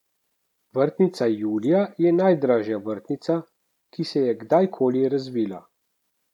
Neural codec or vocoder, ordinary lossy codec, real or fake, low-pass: none; none; real; 19.8 kHz